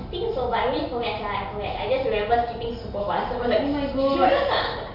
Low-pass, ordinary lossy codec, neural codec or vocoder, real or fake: 5.4 kHz; none; none; real